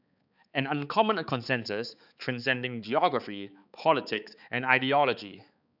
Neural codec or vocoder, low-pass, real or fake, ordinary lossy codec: codec, 16 kHz, 4 kbps, X-Codec, HuBERT features, trained on balanced general audio; 5.4 kHz; fake; none